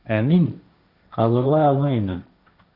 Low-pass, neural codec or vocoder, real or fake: 5.4 kHz; codec, 16 kHz, 1.1 kbps, Voila-Tokenizer; fake